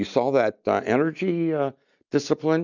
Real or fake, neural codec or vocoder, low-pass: real; none; 7.2 kHz